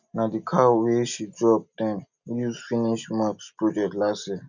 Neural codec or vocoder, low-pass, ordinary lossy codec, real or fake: none; 7.2 kHz; none; real